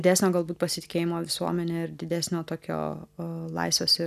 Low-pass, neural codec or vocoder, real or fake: 14.4 kHz; none; real